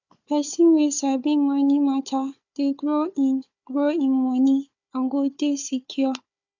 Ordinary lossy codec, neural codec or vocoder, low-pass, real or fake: none; codec, 16 kHz, 4 kbps, FunCodec, trained on Chinese and English, 50 frames a second; 7.2 kHz; fake